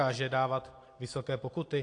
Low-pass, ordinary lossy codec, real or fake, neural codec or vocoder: 9.9 kHz; AAC, 48 kbps; real; none